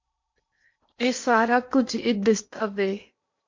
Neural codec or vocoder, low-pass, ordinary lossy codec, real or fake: codec, 16 kHz in and 24 kHz out, 0.8 kbps, FocalCodec, streaming, 65536 codes; 7.2 kHz; MP3, 48 kbps; fake